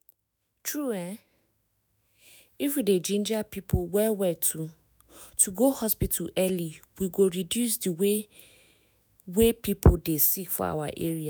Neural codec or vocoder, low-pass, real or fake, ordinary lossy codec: autoencoder, 48 kHz, 128 numbers a frame, DAC-VAE, trained on Japanese speech; none; fake; none